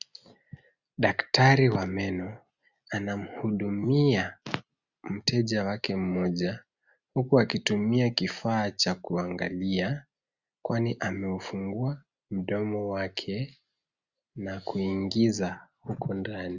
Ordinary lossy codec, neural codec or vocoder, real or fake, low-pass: Opus, 64 kbps; none; real; 7.2 kHz